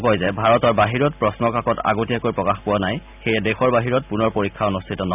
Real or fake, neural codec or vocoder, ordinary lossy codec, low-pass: real; none; none; 3.6 kHz